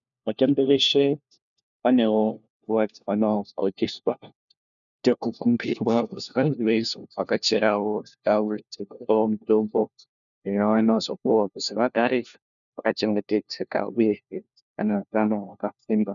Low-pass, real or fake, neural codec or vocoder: 7.2 kHz; fake; codec, 16 kHz, 1 kbps, FunCodec, trained on LibriTTS, 50 frames a second